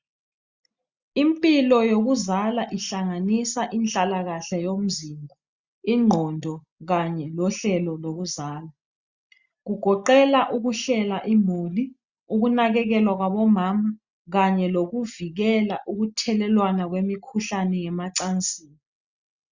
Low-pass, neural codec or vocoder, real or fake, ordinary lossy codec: 7.2 kHz; none; real; Opus, 64 kbps